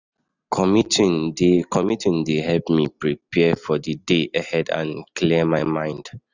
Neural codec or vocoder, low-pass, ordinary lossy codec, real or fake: none; 7.2 kHz; none; real